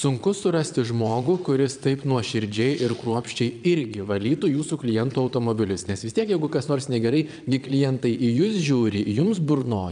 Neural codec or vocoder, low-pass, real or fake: vocoder, 22.05 kHz, 80 mel bands, Vocos; 9.9 kHz; fake